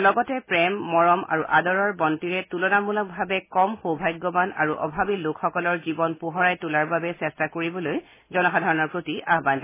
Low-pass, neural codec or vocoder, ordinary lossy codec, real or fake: 3.6 kHz; none; MP3, 16 kbps; real